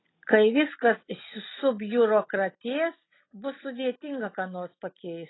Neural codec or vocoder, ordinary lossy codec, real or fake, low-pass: none; AAC, 16 kbps; real; 7.2 kHz